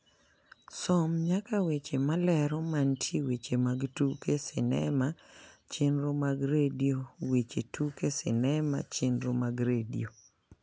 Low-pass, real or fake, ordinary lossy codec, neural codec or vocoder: none; real; none; none